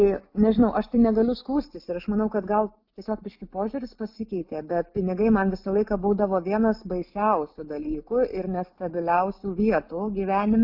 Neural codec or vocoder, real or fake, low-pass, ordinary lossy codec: none; real; 5.4 kHz; Opus, 64 kbps